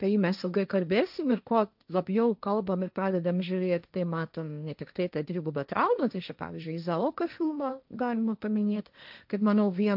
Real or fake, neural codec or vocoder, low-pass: fake; codec, 16 kHz, 1.1 kbps, Voila-Tokenizer; 5.4 kHz